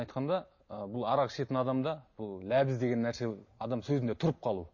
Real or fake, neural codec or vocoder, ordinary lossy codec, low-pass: real; none; MP3, 32 kbps; 7.2 kHz